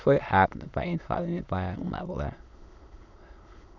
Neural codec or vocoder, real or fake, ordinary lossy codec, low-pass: autoencoder, 22.05 kHz, a latent of 192 numbers a frame, VITS, trained on many speakers; fake; none; 7.2 kHz